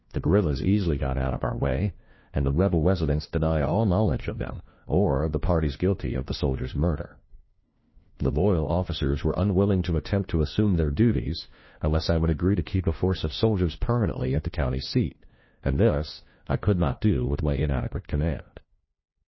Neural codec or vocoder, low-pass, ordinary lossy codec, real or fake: codec, 16 kHz, 1 kbps, FunCodec, trained on LibriTTS, 50 frames a second; 7.2 kHz; MP3, 24 kbps; fake